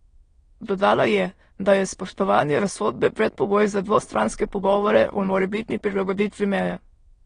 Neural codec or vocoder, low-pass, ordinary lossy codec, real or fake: autoencoder, 22.05 kHz, a latent of 192 numbers a frame, VITS, trained on many speakers; 9.9 kHz; AAC, 32 kbps; fake